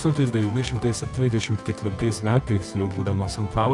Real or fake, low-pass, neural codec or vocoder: fake; 10.8 kHz; codec, 24 kHz, 0.9 kbps, WavTokenizer, medium music audio release